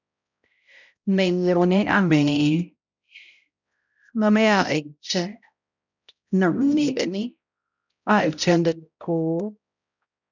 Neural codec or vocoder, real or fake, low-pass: codec, 16 kHz, 0.5 kbps, X-Codec, HuBERT features, trained on balanced general audio; fake; 7.2 kHz